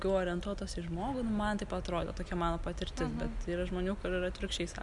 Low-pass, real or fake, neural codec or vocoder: 10.8 kHz; real; none